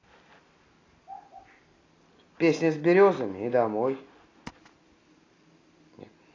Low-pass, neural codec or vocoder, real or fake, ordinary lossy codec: 7.2 kHz; none; real; AAC, 32 kbps